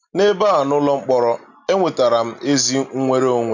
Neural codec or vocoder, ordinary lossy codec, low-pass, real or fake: none; none; 7.2 kHz; real